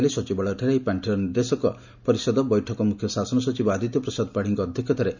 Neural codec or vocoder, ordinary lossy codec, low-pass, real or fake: none; none; 7.2 kHz; real